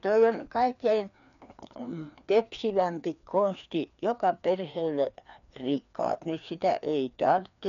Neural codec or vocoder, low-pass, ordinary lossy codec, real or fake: codec, 16 kHz, 2 kbps, FreqCodec, larger model; 7.2 kHz; none; fake